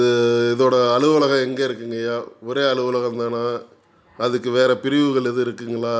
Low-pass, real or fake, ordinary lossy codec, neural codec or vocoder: none; real; none; none